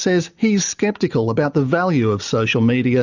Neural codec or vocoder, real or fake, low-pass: none; real; 7.2 kHz